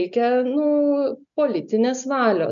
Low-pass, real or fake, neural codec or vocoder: 7.2 kHz; real; none